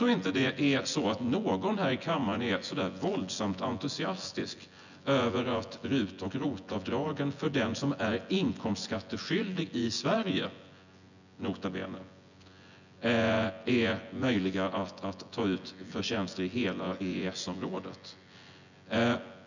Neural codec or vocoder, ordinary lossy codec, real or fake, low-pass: vocoder, 24 kHz, 100 mel bands, Vocos; none; fake; 7.2 kHz